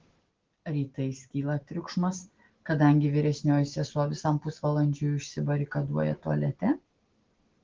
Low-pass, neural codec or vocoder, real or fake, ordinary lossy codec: 7.2 kHz; none; real; Opus, 16 kbps